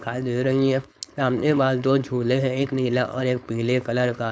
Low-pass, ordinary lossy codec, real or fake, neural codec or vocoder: none; none; fake; codec, 16 kHz, 4.8 kbps, FACodec